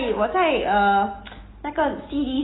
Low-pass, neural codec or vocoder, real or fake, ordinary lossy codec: 7.2 kHz; none; real; AAC, 16 kbps